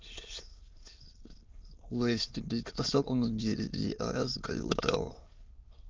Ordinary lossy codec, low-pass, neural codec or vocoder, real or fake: Opus, 32 kbps; 7.2 kHz; autoencoder, 22.05 kHz, a latent of 192 numbers a frame, VITS, trained on many speakers; fake